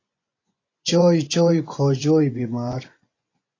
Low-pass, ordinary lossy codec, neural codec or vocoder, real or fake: 7.2 kHz; AAC, 32 kbps; vocoder, 24 kHz, 100 mel bands, Vocos; fake